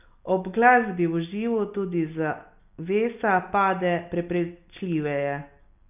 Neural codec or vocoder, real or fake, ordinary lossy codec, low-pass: none; real; none; 3.6 kHz